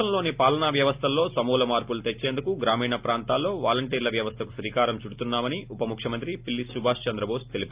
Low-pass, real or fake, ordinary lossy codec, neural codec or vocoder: 3.6 kHz; real; Opus, 32 kbps; none